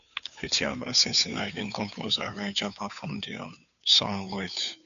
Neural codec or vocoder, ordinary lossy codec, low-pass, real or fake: codec, 16 kHz, 2 kbps, FunCodec, trained on Chinese and English, 25 frames a second; none; 7.2 kHz; fake